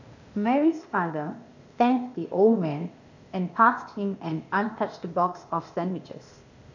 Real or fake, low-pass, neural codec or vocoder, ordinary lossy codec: fake; 7.2 kHz; codec, 16 kHz, 0.8 kbps, ZipCodec; none